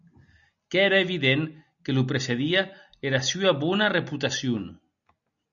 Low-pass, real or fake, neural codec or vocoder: 7.2 kHz; real; none